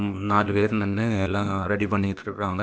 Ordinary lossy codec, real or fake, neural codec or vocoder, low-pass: none; fake; codec, 16 kHz, 0.8 kbps, ZipCodec; none